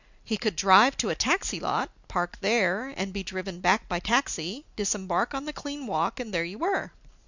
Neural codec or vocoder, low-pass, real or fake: none; 7.2 kHz; real